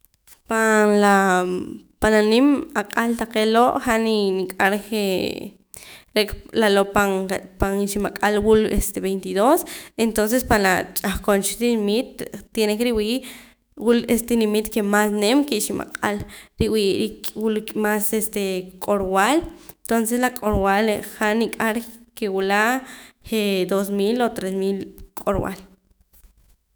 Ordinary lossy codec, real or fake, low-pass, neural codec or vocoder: none; fake; none; autoencoder, 48 kHz, 128 numbers a frame, DAC-VAE, trained on Japanese speech